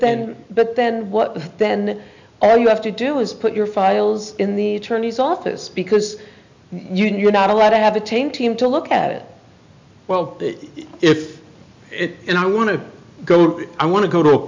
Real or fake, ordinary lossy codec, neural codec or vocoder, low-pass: real; MP3, 64 kbps; none; 7.2 kHz